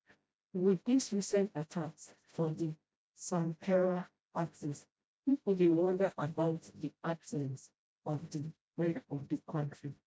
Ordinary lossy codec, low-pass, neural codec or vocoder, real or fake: none; none; codec, 16 kHz, 0.5 kbps, FreqCodec, smaller model; fake